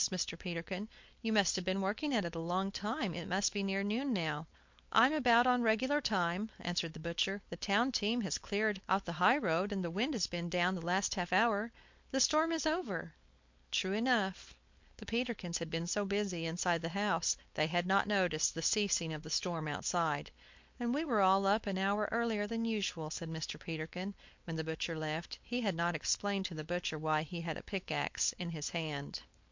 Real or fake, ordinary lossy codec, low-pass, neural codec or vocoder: fake; MP3, 48 kbps; 7.2 kHz; codec, 16 kHz, 4.8 kbps, FACodec